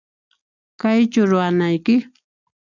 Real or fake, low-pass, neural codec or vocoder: real; 7.2 kHz; none